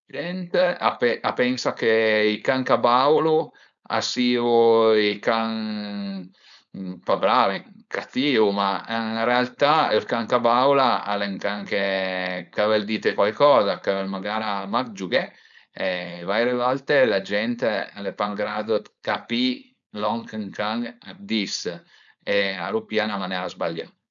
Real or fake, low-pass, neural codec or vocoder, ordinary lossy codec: fake; 7.2 kHz; codec, 16 kHz, 4.8 kbps, FACodec; none